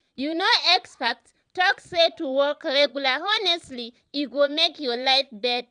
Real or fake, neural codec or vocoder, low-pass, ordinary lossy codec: fake; vocoder, 22.05 kHz, 80 mel bands, Vocos; 9.9 kHz; none